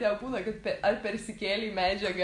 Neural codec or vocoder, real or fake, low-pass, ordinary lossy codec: none; real; 10.8 kHz; AAC, 64 kbps